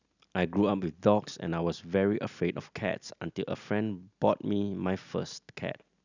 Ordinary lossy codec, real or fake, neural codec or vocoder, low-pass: none; real; none; 7.2 kHz